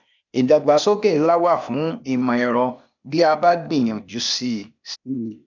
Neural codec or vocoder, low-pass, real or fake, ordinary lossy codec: codec, 16 kHz, 0.8 kbps, ZipCodec; 7.2 kHz; fake; none